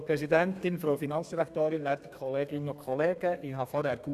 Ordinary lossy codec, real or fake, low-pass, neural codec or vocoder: none; fake; 14.4 kHz; codec, 32 kHz, 1.9 kbps, SNAC